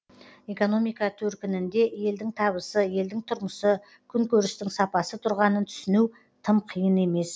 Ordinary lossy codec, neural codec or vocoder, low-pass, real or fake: none; none; none; real